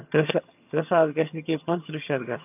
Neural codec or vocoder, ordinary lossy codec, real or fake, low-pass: vocoder, 22.05 kHz, 80 mel bands, HiFi-GAN; AAC, 32 kbps; fake; 3.6 kHz